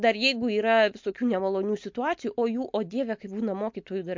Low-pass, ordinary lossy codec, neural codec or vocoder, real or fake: 7.2 kHz; MP3, 48 kbps; none; real